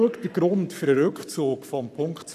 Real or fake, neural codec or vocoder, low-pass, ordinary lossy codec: fake; codec, 44.1 kHz, 7.8 kbps, Pupu-Codec; 14.4 kHz; none